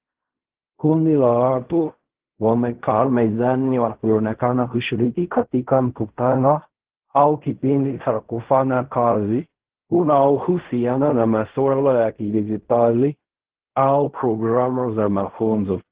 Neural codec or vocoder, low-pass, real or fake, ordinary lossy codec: codec, 16 kHz in and 24 kHz out, 0.4 kbps, LongCat-Audio-Codec, fine tuned four codebook decoder; 3.6 kHz; fake; Opus, 16 kbps